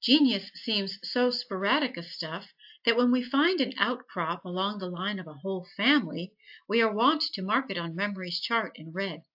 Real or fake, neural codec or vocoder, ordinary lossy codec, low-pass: real; none; AAC, 48 kbps; 5.4 kHz